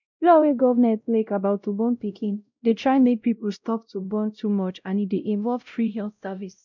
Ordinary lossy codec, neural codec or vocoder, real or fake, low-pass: none; codec, 16 kHz, 0.5 kbps, X-Codec, WavLM features, trained on Multilingual LibriSpeech; fake; 7.2 kHz